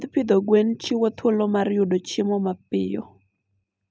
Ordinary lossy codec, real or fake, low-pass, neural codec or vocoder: none; real; none; none